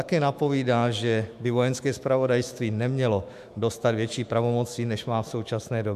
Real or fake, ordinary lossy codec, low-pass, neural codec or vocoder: fake; MP3, 96 kbps; 14.4 kHz; autoencoder, 48 kHz, 128 numbers a frame, DAC-VAE, trained on Japanese speech